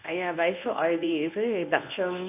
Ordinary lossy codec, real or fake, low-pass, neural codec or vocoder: AAC, 32 kbps; fake; 3.6 kHz; codec, 24 kHz, 0.9 kbps, WavTokenizer, medium speech release version 1